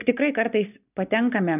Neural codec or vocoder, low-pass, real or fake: none; 3.6 kHz; real